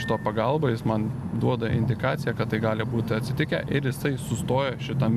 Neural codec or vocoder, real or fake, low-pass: none; real; 14.4 kHz